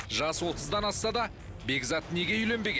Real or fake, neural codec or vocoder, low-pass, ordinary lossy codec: real; none; none; none